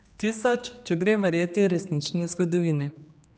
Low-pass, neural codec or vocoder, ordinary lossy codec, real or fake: none; codec, 16 kHz, 2 kbps, X-Codec, HuBERT features, trained on general audio; none; fake